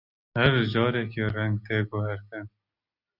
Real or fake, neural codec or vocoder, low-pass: real; none; 5.4 kHz